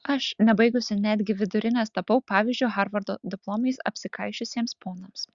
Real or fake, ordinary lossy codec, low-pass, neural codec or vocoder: real; Opus, 64 kbps; 7.2 kHz; none